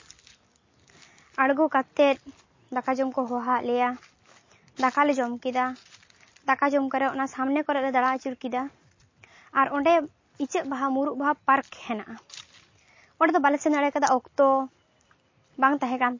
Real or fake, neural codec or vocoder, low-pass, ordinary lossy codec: real; none; 7.2 kHz; MP3, 32 kbps